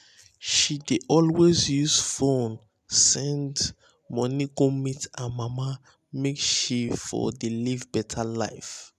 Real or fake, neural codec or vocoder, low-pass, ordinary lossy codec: real; none; 14.4 kHz; none